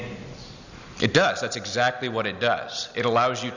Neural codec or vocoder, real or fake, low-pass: none; real; 7.2 kHz